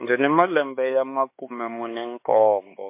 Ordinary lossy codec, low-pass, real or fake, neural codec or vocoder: MP3, 24 kbps; 3.6 kHz; fake; codec, 16 kHz, 4 kbps, X-Codec, HuBERT features, trained on LibriSpeech